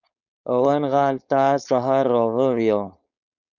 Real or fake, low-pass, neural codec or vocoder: fake; 7.2 kHz; codec, 16 kHz, 4.8 kbps, FACodec